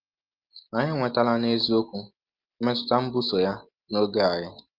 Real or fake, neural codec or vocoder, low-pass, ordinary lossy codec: real; none; 5.4 kHz; Opus, 32 kbps